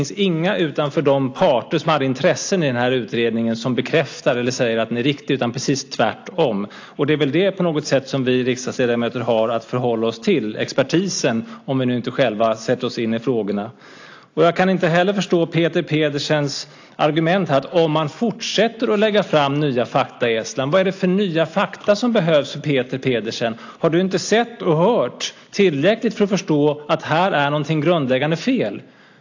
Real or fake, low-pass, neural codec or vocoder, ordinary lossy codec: real; 7.2 kHz; none; AAC, 48 kbps